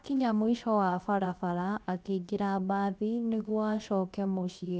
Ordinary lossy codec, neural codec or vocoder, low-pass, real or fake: none; codec, 16 kHz, 0.7 kbps, FocalCodec; none; fake